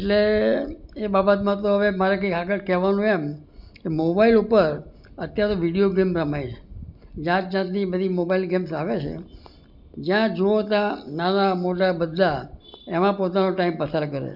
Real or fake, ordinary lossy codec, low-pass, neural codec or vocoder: real; none; 5.4 kHz; none